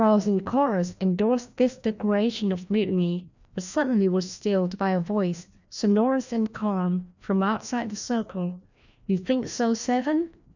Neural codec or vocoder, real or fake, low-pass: codec, 16 kHz, 1 kbps, FreqCodec, larger model; fake; 7.2 kHz